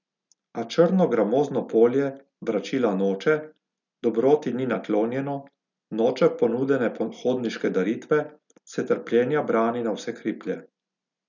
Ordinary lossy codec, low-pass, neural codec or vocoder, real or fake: none; 7.2 kHz; none; real